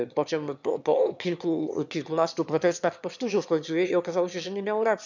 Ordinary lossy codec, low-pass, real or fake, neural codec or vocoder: none; 7.2 kHz; fake; autoencoder, 22.05 kHz, a latent of 192 numbers a frame, VITS, trained on one speaker